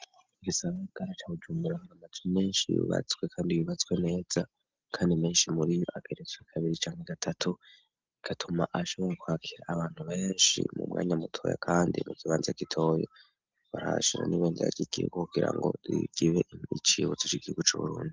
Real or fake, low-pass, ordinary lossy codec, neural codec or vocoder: real; 7.2 kHz; Opus, 32 kbps; none